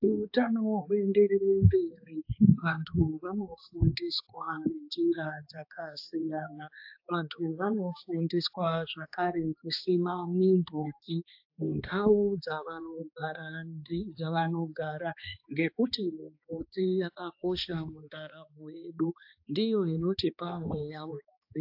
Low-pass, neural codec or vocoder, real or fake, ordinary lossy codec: 5.4 kHz; codec, 16 kHz, 2 kbps, X-Codec, HuBERT features, trained on balanced general audio; fake; AAC, 48 kbps